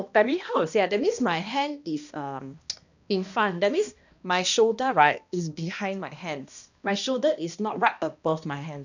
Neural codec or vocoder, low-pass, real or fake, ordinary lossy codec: codec, 16 kHz, 1 kbps, X-Codec, HuBERT features, trained on balanced general audio; 7.2 kHz; fake; none